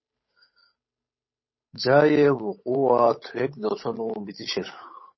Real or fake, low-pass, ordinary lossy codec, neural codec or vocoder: fake; 7.2 kHz; MP3, 24 kbps; codec, 16 kHz, 8 kbps, FunCodec, trained on Chinese and English, 25 frames a second